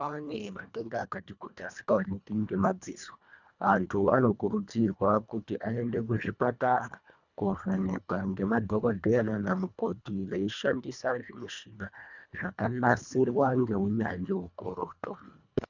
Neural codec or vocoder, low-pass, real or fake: codec, 24 kHz, 1.5 kbps, HILCodec; 7.2 kHz; fake